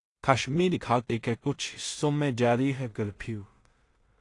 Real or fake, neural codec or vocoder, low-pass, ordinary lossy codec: fake; codec, 16 kHz in and 24 kHz out, 0.4 kbps, LongCat-Audio-Codec, two codebook decoder; 10.8 kHz; AAC, 48 kbps